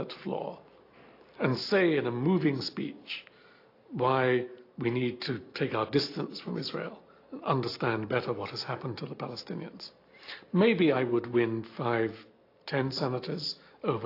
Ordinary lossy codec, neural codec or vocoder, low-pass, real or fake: AAC, 32 kbps; none; 5.4 kHz; real